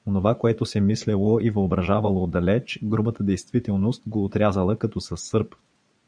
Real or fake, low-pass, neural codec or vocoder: fake; 9.9 kHz; vocoder, 22.05 kHz, 80 mel bands, Vocos